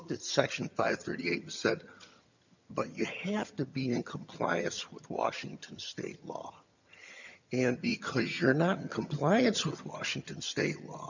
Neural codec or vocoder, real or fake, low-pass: vocoder, 22.05 kHz, 80 mel bands, HiFi-GAN; fake; 7.2 kHz